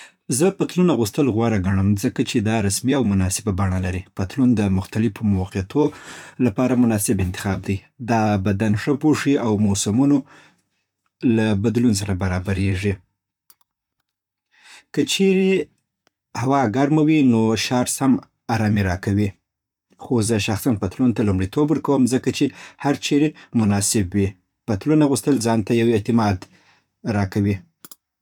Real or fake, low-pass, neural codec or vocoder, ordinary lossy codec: fake; 19.8 kHz; vocoder, 44.1 kHz, 128 mel bands, Pupu-Vocoder; none